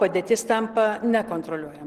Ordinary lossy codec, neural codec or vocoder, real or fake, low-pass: Opus, 16 kbps; none; real; 14.4 kHz